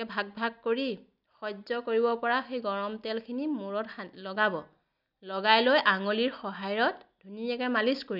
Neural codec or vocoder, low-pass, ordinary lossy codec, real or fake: none; 5.4 kHz; none; real